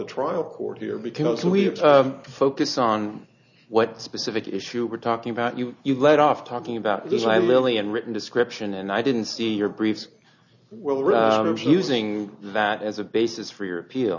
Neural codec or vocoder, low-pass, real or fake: none; 7.2 kHz; real